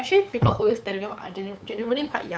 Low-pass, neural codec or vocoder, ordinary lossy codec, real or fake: none; codec, 16 kHz, 8 kbps, FunCodec, trained on LibriTTS, 25 frames a second; none; fake